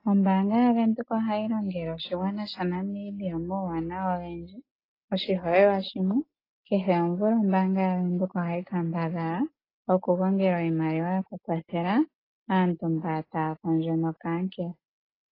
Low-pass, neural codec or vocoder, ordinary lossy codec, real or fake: 5.4 kHz; none; AAC, 24 kbps; real